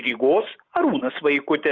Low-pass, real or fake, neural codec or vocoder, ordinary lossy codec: 7.2 kHz; real; none; Opus, 64 kbps